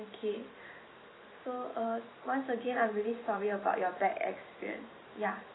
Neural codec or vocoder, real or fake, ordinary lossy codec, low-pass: vocoder, 44.1 kHz, 128 mel bands every 512 samples, BigVGAN v2; fake; AAC, 16 kbps; 7.2 kHz